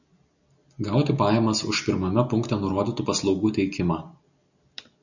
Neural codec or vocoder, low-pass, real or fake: none; 7.2 kHz; real